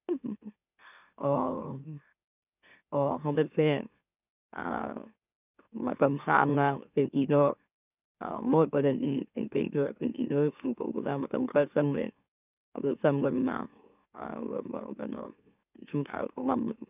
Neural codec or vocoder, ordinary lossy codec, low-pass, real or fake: autoencoder, 44.1 kHz, a latent of 192 numbers a frame, MeloTTS; none; 3.6 kHz; fake